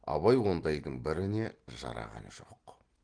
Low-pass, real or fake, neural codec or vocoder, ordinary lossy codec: 9.9 kHz; real; none; Opus, 16 kbps